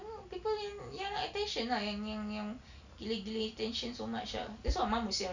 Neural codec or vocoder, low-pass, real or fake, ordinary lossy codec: none; 7.2 kHz; real; none